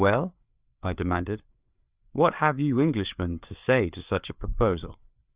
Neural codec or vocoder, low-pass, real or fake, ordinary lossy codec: codec, 16 kHz, 4 kbps, FreqCodec, larger model; 3.6 kHz; fake; Opus, 64 kbps